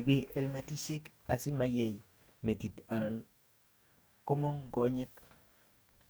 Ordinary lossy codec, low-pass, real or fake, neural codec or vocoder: none; none; fake; codec, 44.1 kHz, 2.6 kbps, DAC